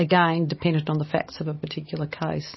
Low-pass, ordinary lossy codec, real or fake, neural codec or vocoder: 7.2 kHz; MP3, 24 kbps; real; none